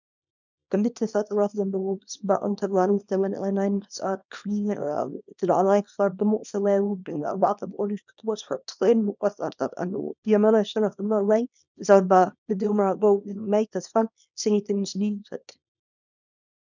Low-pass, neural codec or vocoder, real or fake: 7.2 kHz; codec, 24 kHz, 0.9 kbps, WavTokenizer, small release; fake